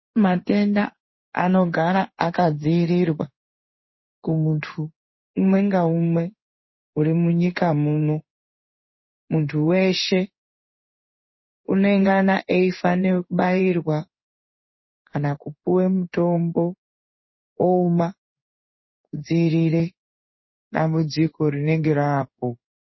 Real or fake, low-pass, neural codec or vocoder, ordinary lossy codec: fake; 7.2 kHz; codec, 16 kHz in and 24 kHz out, 1 kbps, XY-Tokenizer; MP3, 24 kbps